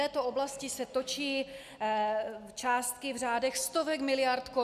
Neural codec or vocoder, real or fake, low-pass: none; real; 14.4 kHz